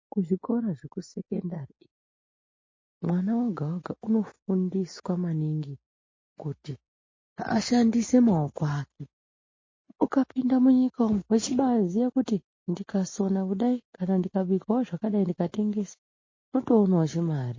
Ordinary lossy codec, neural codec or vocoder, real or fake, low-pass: MP3, 32 kbps; none; real; 7.2 kHz